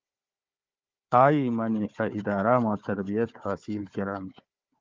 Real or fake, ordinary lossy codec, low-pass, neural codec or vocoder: fake; Opus, 24 kbps; 7.2 kHz; codec, 16 kHz, 4 kbps, FunCodec, trained on Chinese and English, 50 frames a second